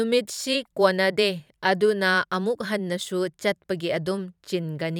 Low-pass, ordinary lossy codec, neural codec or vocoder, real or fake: 19.8 kHz; none; vocoder, 44.1 kHz, 128 mel bands every 512 samples, BigVGAN v2; fake